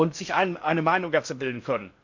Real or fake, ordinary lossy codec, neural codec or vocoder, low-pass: fake; MP3, 64 kbps; codec, 16 kHz in and 24 kHz out, 0.6 kbps, FocalCodec, streaming, 4096 codes; 7.2 kHz